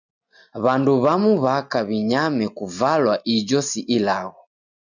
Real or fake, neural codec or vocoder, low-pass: real; none; 7.2 kHz